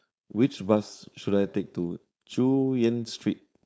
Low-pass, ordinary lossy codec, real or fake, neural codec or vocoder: none; none; fake; codec, 16 kHz, 4.8 kbps, FACodec